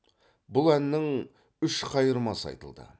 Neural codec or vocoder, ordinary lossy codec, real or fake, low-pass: none; none; real; none